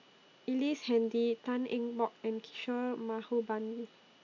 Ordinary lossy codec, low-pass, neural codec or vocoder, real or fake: AAC, 48 kbps; 7.2 kHz; none; real